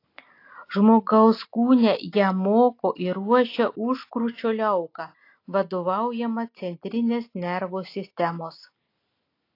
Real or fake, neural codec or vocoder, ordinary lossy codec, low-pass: real; none; AAC, 32 kbps; 5.4 kHz